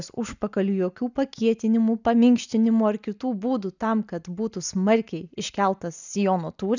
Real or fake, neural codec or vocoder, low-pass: real; none; 7.2 kHz